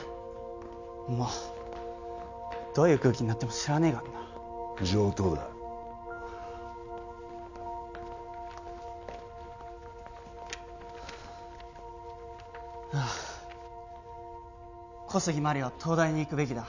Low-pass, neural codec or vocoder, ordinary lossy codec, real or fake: 7.2 kHz; none; none; real